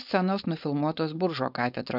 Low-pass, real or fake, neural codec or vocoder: 5.4 kHz; fake; codec, 16 kHz, 4.8 kbps, FACodec